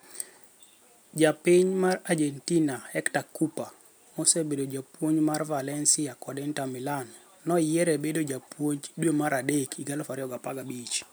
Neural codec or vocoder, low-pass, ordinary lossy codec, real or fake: none; none; none; real